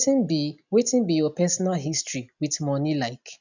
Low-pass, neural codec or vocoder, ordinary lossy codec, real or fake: 7.2 kHz; none; none; real